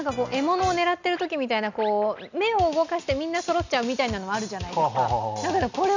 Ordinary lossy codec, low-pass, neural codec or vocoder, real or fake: none; 7.2 kHz; none; real